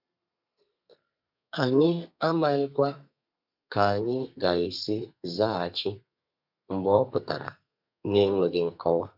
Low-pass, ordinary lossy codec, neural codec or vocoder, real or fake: 5.4 kHz; none; codec, 32 kHz, 1.9 kbps, SNAC; fake